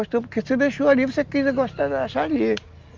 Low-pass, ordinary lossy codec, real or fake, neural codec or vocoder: 7.2 kHz; Opus, 32 kbps; real; none